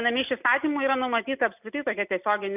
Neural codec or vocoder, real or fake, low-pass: none; real; 3.6 kHz